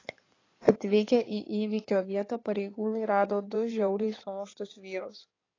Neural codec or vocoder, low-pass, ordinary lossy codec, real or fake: codec, 16 kHz in and 24 kHz out, 2.2 kbps, FireRedTTS-2 codec; 7.2 kHz; AAC, 32 kbps; fake